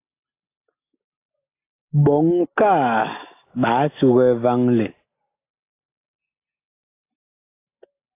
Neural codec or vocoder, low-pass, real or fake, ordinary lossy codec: none; 3.6 kHz; real; AAC, 24 kbps